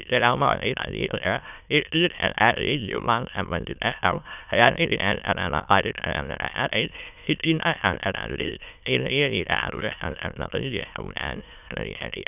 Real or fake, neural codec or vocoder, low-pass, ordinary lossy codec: fake; autoencoder, 22.05 kHz, a latent of 192 numbers a frame, VITS, trained on many speakers; 3.6 kHz; none